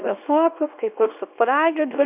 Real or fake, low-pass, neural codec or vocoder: fake; 3.6 kHz; codec, 24 kHz, 0.9 kbps, WavTokenizer, small release